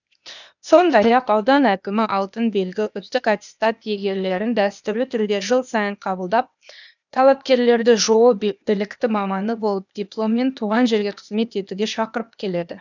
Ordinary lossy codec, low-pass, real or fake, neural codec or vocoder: none; 7.2 kHz; fake; codec, 16 kHz, 0.8 kbps, ZipCodec